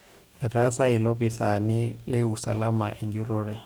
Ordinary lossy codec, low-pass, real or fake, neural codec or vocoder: none; none; fake; codec, 44.1 kHz, 2.6 kbps, DAC